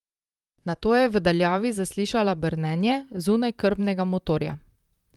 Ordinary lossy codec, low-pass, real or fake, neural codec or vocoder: Opus, 32 kbps; 19.8 kHz; fake; vocoder, 44.1 kHz, 128 mel bands, Pupu-Vocoder